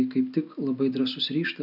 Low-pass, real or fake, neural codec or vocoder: 5.4 kHz; real; none